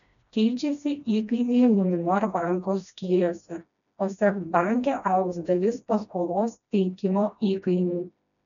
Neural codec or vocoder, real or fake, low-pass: codec, 16 kHz, 1 kbps, FreqCodec, smaller model; fake; 7.2 kHz